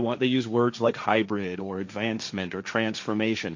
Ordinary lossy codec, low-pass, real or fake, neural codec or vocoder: MP3, 48 kbps; 7.2 kHz; fake; codec, 16 kHz, 1.1 kbps, Voila-Tokenizer